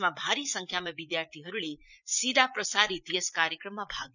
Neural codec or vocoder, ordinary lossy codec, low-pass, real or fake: vocoder, 44.1 kHz, 80 mel bands, Vocos; none; 7.2 kHz; fake